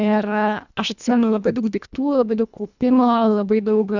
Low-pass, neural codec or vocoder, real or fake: 7.2 kHz; codec, 24 kHz, 1.5 kbps, HILCodec; fake